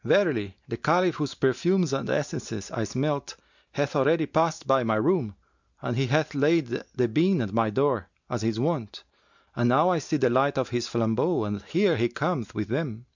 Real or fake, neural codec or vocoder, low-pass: real; none; 7.2 kHz